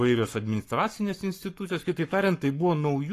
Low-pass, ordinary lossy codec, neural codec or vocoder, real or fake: 14.4 kHz; AAC, 48 kbps; codec, 44.1 kHz, 7.8 kbps, Pupu-Codec; fake